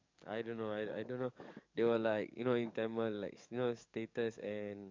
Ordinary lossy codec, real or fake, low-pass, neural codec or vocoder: MP3, 64 kbps; fake; 7.2 kHz; codec, 44.1 kHz, 7.8 kbps, DAC